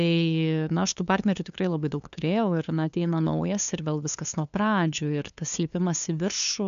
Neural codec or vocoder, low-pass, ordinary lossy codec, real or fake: codec, 16 kHz, 2 kbps, FunCodec, trained on LibriTTS, 25 frames a second; 7.2 kHz; AAC, 96 kbps; fake